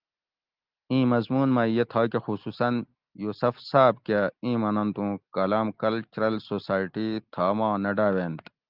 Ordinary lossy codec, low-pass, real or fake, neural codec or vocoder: Opus, 24 kbps; 5.4 kHz; real; none